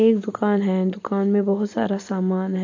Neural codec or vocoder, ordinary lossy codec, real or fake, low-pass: none; AAC, 48 kbps; real; 7.2 kHz